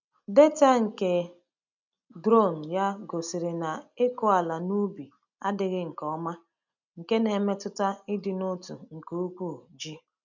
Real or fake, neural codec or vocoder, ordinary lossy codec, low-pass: real; none; none; 7.2 kHz